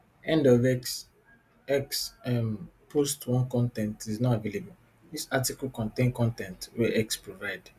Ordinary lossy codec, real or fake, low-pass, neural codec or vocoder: Opus, 64 kbps; real; 14.4 kHz; none